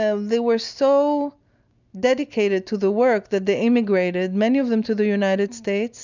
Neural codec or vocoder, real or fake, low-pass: none; real; 7.2 kHz